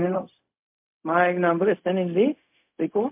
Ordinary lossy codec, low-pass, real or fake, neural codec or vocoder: MP3, 32 kbps; 3.6 kHz; fake; codec, 16 kHz, 0.4 kbps, LongCat-Audio-Codec